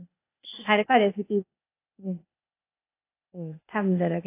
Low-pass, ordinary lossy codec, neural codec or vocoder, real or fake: 3.6 kHz; AAC, 24 kbps; codec, 16 kHz, 0.8 kbps, ZipCodec; fake